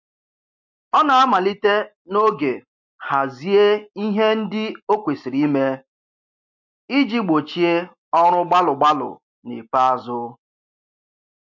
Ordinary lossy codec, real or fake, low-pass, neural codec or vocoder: MP3, 48 kbps; real; 7.2 kHz; none